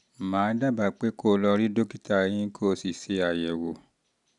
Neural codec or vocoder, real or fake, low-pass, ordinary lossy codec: none; real; 10.8 kHz; none